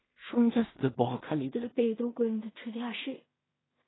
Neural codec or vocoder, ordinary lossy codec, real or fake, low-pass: codec, 16 kHz in and 24 kHz out, 0.4 kbps, LongCat-Audio-Codec, two codebook decoder; AAC, 16 kbps; fake; 7.2 kHz